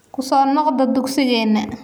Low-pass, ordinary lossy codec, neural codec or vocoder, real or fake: none; none; none; real